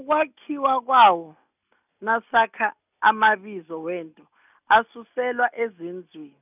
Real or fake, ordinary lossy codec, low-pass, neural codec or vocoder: real; none; 3.6 kHz; none